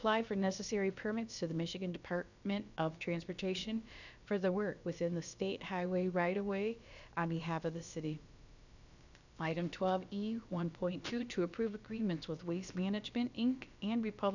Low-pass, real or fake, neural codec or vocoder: 7.2 kHz; fake; codec, 16 kHz, about 1 kbps, DyCAST, with the encoder's durations